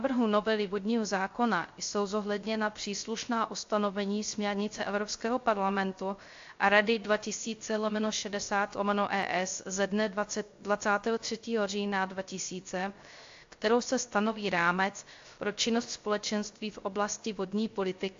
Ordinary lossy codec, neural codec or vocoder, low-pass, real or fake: AAC, 48 kbps; codec, 16 kHz, 0.3 kbps, FocalCodec; 7.2 kHz; fake